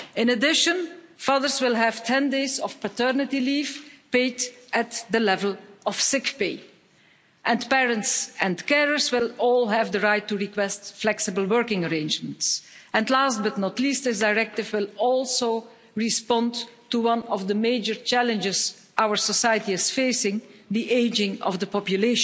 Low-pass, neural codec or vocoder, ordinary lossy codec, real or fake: none; none; none; real